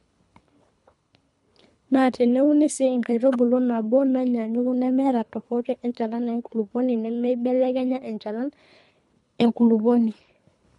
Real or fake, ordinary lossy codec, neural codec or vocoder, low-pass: fake; MP3, 64 kbps; codec, 24 kHz, 3 kbps, HILCodec; 10.8 kHz